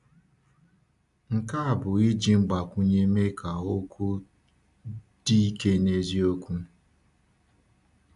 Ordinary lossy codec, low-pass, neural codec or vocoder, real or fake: AAC, 96 kbps; 10.8 kHz; none; real